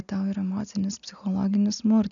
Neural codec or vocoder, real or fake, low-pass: none; real; 7.2 kHz